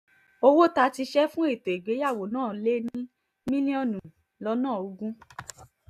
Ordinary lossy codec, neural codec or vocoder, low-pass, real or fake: none; none; 14.4 kHz; real